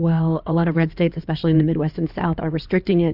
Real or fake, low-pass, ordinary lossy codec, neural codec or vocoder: fake; 5.4 kHz; AAC, 48 kbps; codec, 16 kHz in and 24 kHz out, 2.2 kbps, FireRedTTS-2 codec